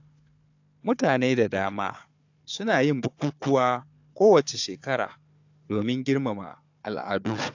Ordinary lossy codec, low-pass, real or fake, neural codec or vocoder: AAC, 48 kbps; 7.2 kHz; fake; codec, 16 kHz, 4 kbps, FunCodec, trained on Chinese and English, 50 frames a second